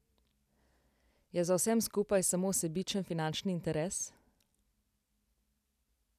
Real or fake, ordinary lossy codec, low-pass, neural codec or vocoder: real; none; 14.4 kHz; none